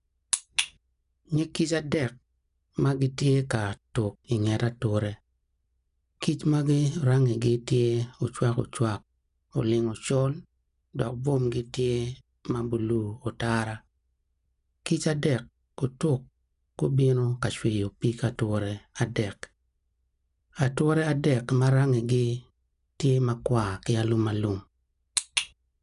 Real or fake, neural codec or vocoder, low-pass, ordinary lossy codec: real; none; 10.8 kHz; none